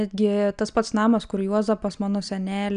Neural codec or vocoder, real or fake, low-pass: none; real; 9.9 kHz